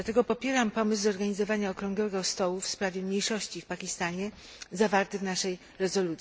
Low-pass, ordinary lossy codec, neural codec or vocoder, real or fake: none; none; none; real